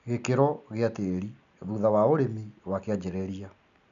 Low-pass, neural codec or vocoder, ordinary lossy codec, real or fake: 7.2 kHz; none; none; real